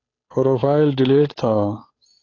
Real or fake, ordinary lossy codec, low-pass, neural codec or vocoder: fake; AAC, 32 kbps; 7.2 kHz; codec, 16 kHz, 8 kbps, FunCodec, trained on Chinese and English, 25 frames a second